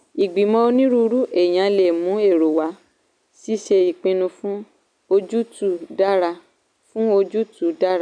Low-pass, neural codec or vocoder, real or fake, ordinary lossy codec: 9.9 kHz; none; real; none